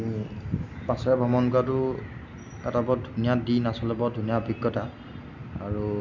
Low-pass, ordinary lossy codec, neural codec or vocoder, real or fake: 7.2 kHz; none; none; real